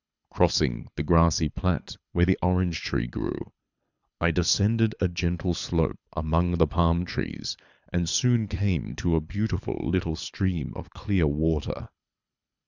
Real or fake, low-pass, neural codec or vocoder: fake; 7.2 kHz; codec, 24 kHz, 6 kbps, HILCodec